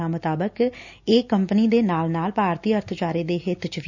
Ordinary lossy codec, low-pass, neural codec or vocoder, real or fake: none; 7.2 kHz; none; real